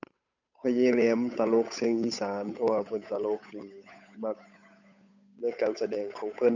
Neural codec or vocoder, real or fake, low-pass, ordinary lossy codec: codec, 16 kHz, 8 kbps, FunCodec, trained on Chinese and English, 25 frames a second; fake; 7.2 kHz; none